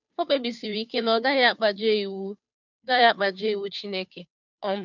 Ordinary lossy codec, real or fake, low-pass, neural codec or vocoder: none; fake; 7.2 kHz; codec, 16 kHz, 2 kbps, FunCodec, trained on Chinese and English, 25 frames a second